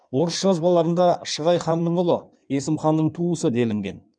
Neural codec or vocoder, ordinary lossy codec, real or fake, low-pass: codec, 16 kHz in and 24 kHz out, 1.1 kbps, FireRedTTS-2 codec; none; fake; 9.9 kHz